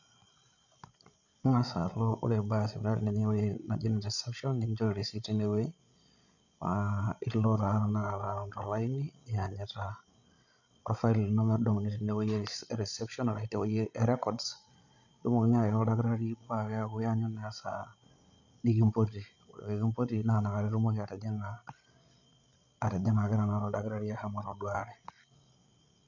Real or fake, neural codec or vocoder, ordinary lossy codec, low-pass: fake; codec, 16 kHz, 16 kbps, FreqCodec, larger model; none; 7.2 kHz